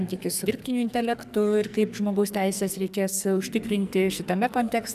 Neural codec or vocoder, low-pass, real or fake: codec, 44.1 kHz, 2.6 kbps, SNAC; 14.4 kHz; fake